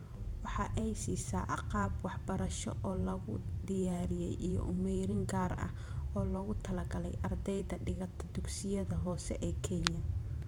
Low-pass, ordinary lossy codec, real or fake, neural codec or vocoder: 19.8 kHz; MP3, 96 kbps; fake; vocoder, 44.1 kHz, 128 mel bands every 512 samples, BigVGAN v2